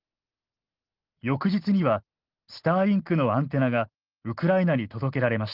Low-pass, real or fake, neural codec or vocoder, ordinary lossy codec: 5.4 kHz; real; none; Opus, 16 kbps